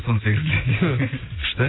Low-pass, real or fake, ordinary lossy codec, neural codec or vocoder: 7.2 kHz; real; AAC, 16 kbps; none